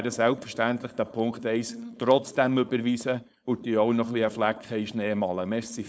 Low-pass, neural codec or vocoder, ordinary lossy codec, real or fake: none; codec, 16 kHz, 4.8 kbps, FACodec; none; fake